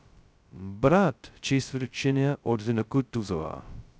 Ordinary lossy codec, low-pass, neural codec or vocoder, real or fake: none; none; codec, 16 kHz, 0.2 kbps, FocalCodec; fake